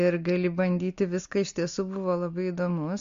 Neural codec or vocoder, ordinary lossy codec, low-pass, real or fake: none; MP3, 48 kbps; 7.2 kHz; real